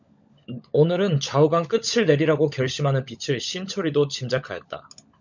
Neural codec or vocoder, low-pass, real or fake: codec, 16 kHz, 16 kbps, FunCodec, trained on LibriTTS, 50 frames a second; 7.2 kHz; fake